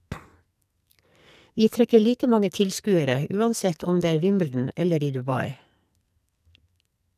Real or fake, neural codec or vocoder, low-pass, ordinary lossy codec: fake; codec, 44.1 kHz, 2.6 kbps, SNAC; 14.4 kHz; none